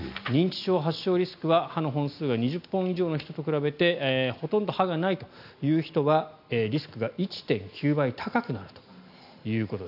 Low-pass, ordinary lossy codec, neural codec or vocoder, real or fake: 5.4 kHz; none; none; real